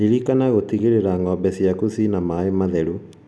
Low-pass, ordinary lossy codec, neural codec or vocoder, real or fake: none; none; none; real